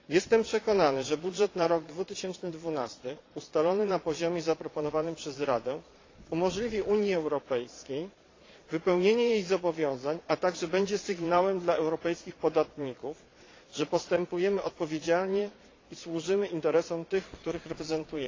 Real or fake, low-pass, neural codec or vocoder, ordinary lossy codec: fake; 7.2 kHz; vocoder, 22.05 kHz, 80 mel bands, Vocos; AAC, 32 kbps